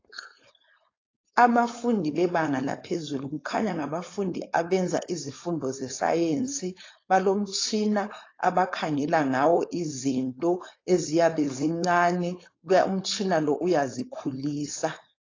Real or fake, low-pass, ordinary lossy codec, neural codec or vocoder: fake; 7.2 kHz; AAC, 32 kbps; codec, 16 kHz, 4.8 kbps, FACodec